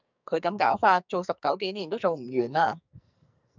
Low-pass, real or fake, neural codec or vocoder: 7.2 kHz; fake; codec, 44.1 kHz, 2.6 kbps, SNAC